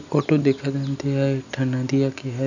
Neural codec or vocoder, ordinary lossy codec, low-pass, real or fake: none; none; 7.2 kHz; real